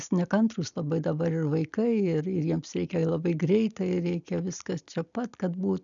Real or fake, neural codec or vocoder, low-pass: real; none; 7.2 kHz